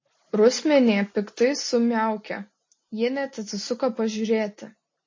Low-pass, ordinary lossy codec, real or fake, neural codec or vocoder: 7.2 kHz; MP3, 32 kbps; real; none